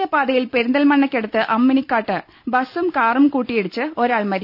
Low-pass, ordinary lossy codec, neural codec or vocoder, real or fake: 5.4 kHz; none; none; real